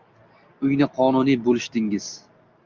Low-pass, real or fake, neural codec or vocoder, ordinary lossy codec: 7.2 kHz; real; none; Opus, 24 kbps